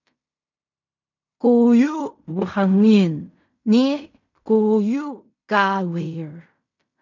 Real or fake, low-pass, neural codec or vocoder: fake; 7.2 kHz; codec, 16 kHz in and 24 kHz out, 0.4 kbps, LongCat-Audio-Codec, fine tuned four codebook decoder